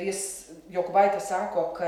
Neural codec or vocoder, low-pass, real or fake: none; 19.8 kHz; real